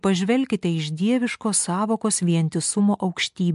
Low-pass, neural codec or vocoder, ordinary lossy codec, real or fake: 10.8 kHz; none; MP3, 64 kbps; real